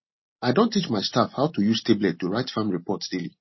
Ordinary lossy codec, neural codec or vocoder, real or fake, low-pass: MP3, 24 kbps; none; real; 7.2 kHz